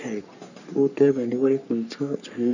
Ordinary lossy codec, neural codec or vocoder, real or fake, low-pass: none; codec, 44.1 kHz, 3.4 kbps, Pupu-Codec; fake; 7.2 kHz